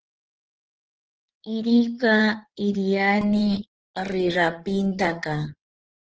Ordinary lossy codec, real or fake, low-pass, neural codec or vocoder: Opus, 16 kbps; fake; 7.2 kHz; codec, 16 kHz in and 24 kHz out, 2.2 kbps, FireRedTTS-2 codec